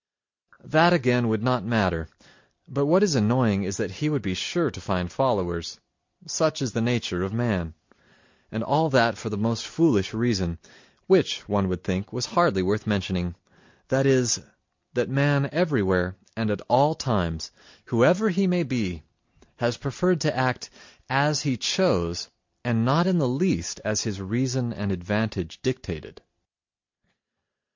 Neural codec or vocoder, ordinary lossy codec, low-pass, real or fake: none; MP3, 48 kbps; 7.2 kHz; real